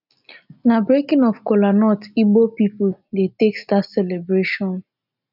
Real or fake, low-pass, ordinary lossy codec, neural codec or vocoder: real; 5.4 kHz; none; none